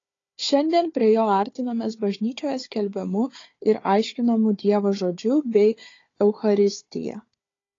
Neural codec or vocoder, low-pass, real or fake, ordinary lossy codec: codec, 16 kHz, 4 kbps, FunCodec, trained on Chinese and English, 50 frames a second; 7.2 kHz; fake; AAC, 32 kbps